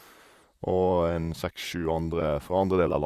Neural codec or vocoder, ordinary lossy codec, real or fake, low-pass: vocoder, 44.1 kHz, 128 mel bands, Pupu-Vocoder; Opus, 64 kbps; fake; 14.4 kHz